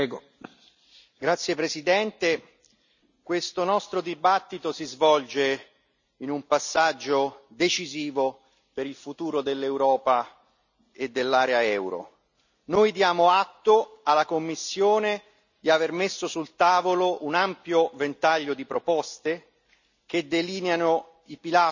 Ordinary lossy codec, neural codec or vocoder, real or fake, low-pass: none; none; real; 7.2 kHz